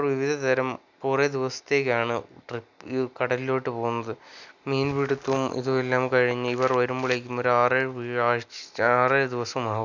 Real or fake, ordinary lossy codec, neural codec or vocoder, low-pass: real; none; none; 7.2 kHz